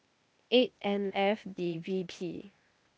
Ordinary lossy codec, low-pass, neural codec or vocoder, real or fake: none; none; codec, 16 kHz, 0.8 kbps, ZipCodec; fake